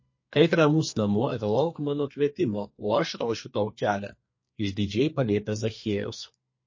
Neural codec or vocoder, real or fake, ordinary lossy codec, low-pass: codec, 32 kHz, 1.9 kbps, SNAC; fake; MP3, 32 kbps; 7.2 kHz